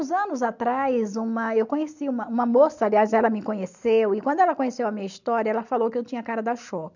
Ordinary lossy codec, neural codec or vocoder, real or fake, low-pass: none; none; real; 7.2 kHz